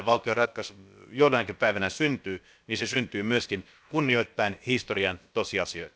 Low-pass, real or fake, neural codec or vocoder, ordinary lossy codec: none; fake; codec, 16 kHz, about 1 kbps, DyCAST, with the encoder's durations; none